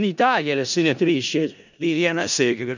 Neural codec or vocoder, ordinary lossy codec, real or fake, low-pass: codec, 16 kHz in and 24 kHz out, 0.4 kbps, LongCat-Audio-Codec, four codebook decoder; none; fake; 7.2 kHz